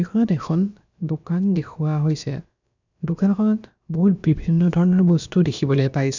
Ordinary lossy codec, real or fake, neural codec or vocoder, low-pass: none; fake; codec, 16 kHz, about 1 kbps, DyCAST, with the encoder's durations; 7.2 kHz